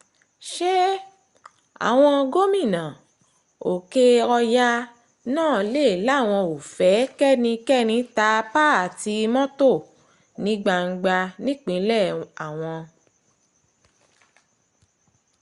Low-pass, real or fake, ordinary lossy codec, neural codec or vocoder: 10.8 kHz; real; Opus, 64 kbps; none